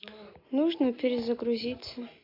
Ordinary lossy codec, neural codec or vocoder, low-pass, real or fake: AAC, 32 kbps; none; 5.4 kHz; real